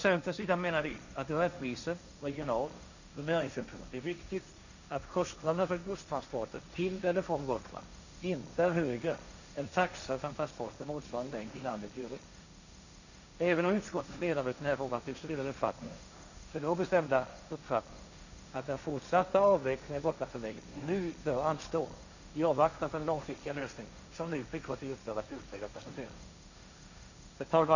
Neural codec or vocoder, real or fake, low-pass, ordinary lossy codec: codec, 16 kHz, 1.1 kbps, Voila-Tokenizer; fake; 7.2 kHz; none